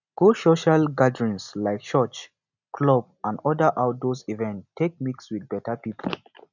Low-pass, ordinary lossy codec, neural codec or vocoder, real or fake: 7.2 kHz; none; none; real